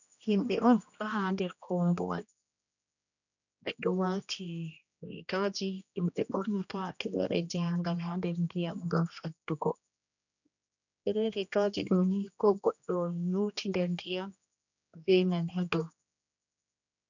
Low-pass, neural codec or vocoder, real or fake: 7.2 kHz; codec, 16 kHz, 1 kbps, X-Codec, HuBERT features, trained on general audio; fake